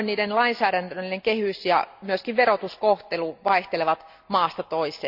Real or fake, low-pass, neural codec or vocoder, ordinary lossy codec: real; 5.4 kHz; none; Opus, 64 kbps